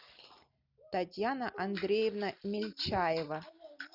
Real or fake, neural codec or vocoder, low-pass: real; none; 5.4 kHz